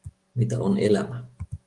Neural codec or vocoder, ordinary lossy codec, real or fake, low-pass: autoencoder, 48 kHz, 128 numbers a frame, DAC-VAE, trained on Japanese speech; Opus, 24 kbps; fake; 10.8 kHz